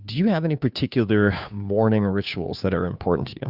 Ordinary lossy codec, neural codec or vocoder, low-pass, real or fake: Opus, 64 kbps; codec, 16 kHz, 2 kbps, FunCodec, trained on Chinese and English, 25 frames a second; 5.4 kHz; fake